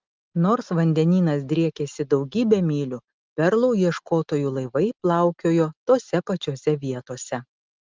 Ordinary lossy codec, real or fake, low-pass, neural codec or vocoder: Opus, 32 kbps; real; 7.2 kHz; none